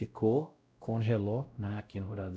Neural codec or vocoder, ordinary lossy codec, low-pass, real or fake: codec, 16 kHz, 0.5 kbps, X-Codec, WavLM features, trained on Multilingual LibriSpeech; none; none; fake